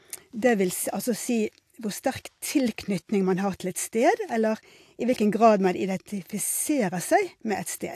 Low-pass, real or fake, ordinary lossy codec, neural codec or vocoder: 14.4 kHz; real; AAC, 64 kbps; none